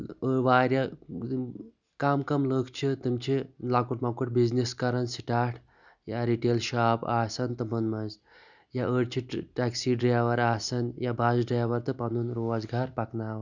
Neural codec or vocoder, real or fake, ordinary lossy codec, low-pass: none; real; none; 7.2 kHz